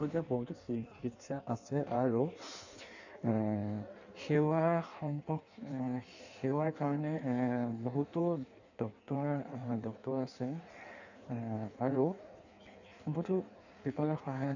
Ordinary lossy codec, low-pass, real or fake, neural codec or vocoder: none; 7.2 kHz; fake; codec, 16 kHz in and 24 kHz out, 1.1 kbps, FireRedTTS-2 codec